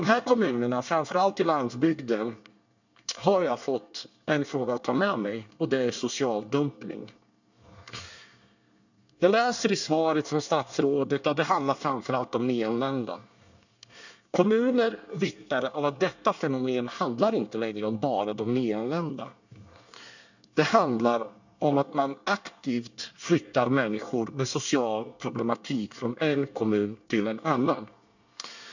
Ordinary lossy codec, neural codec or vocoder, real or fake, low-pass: none; codec, 24 kHz, 1 kbps, SNAC; fake; 7.2 kHz